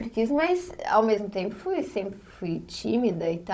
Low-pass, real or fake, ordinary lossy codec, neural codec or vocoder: none; fake; none; codec, 16 kHz, 16 kbps, FunCodec, trained on Chinese and English, 50 frames a second